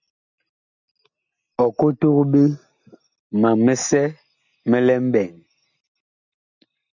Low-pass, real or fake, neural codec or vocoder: 7.2 kHz; real; none